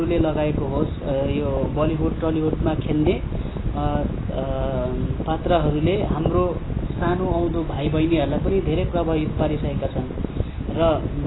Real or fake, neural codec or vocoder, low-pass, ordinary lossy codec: real; none; 7.2 kHz; AAC, 16 kbps